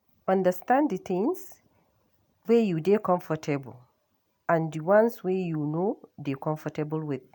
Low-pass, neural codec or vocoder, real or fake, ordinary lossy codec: 19.8 kHz; none; real; MP3, 96 kbps